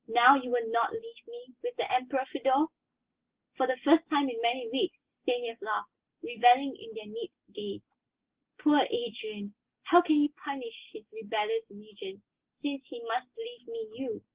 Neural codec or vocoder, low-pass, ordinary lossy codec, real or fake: none; 3.6 kHz; Opus, 16 kbps; real